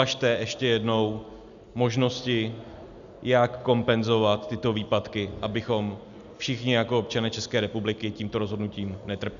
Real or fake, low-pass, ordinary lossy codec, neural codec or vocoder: real; 7.2 kHz; MP3, 96 kbps; none